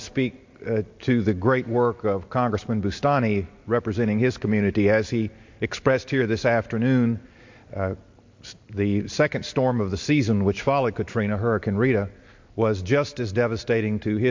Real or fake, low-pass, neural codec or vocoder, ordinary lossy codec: real; 7.2 kHz; none; MP3, 48 kbps